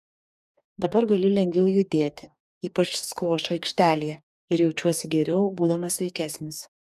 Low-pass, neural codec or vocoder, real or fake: 14.4 kHz; codec, 44.1 kHz, 2.6 kbps, DAC; fake